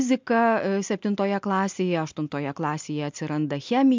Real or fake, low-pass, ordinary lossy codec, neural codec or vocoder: real; 7.2 kHz; MP3, 64 kbps; none